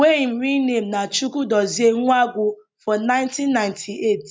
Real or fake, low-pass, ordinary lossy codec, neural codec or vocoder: real; none; none; none